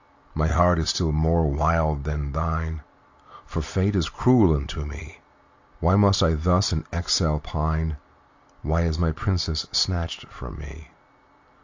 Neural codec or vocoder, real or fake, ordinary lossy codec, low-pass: none; real; AAC, 48 kbps; 7.2 kHz